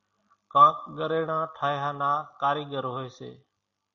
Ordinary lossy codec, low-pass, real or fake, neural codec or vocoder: AAC, 64 kbps; 7.2 kHz; real; none